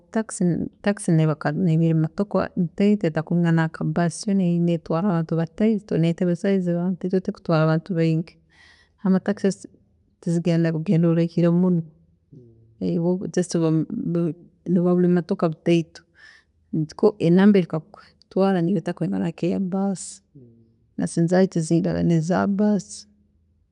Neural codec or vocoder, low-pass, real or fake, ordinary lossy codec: none; 10.8 kHz; real; none